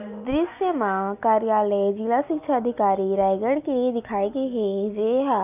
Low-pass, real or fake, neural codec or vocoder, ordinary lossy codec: 3.6 kHz; real; none; none